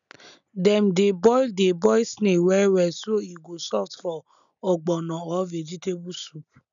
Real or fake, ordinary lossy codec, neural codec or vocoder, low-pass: real; none; none; 7.2 kHz